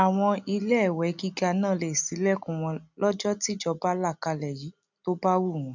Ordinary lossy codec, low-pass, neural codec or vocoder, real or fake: none; 7.2 kHz; none; real